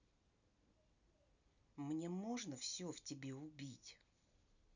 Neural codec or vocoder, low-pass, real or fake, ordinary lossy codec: none; 7.2 kHz; real; none